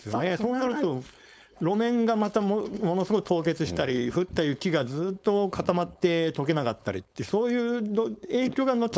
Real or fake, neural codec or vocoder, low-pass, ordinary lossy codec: fake; codec, 16 kHz, 4.8 kbps, FACodec; none; none